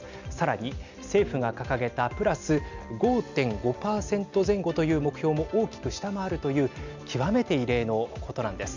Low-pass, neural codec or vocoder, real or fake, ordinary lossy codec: 7.2 kHz; none; real; none